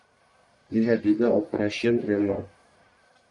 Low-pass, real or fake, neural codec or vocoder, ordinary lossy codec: 10.8 kHz; fake; codec, 44.1 kHz, 1.7 kbps, Pupu-Codec; MP3, 96 kbps